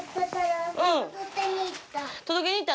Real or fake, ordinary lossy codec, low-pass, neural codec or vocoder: real; none; none; none